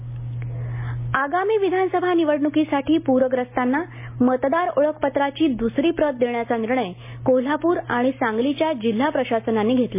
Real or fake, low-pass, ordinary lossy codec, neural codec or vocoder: real; 3.6 kHz; MP3, 24 kbps; none